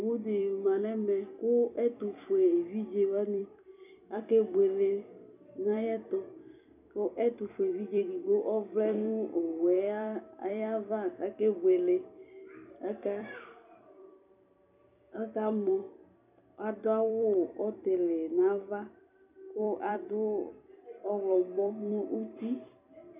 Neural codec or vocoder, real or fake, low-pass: vocoder, 44.1 kHz, 128 mel bands every 256 samples, BigVGAN v2; fake; 3.6 kHz